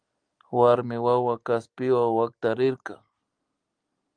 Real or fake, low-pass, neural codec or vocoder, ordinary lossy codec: real; 9.9 kHz; none; Opus, 32 kbps